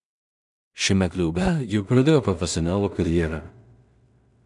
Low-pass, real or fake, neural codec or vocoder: 10.8 kHz; fake; codec, 16 kHz in and 24 kHz out, 0.4 kbps, LongCat-Audio-Codec, two codebook decoder